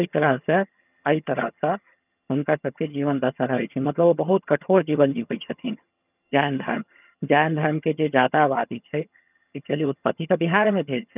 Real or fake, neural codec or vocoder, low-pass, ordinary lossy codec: fake; vocoder, 22.05 kHz, 80 mel bands, HiFi-GAN; 3.6 kHz; none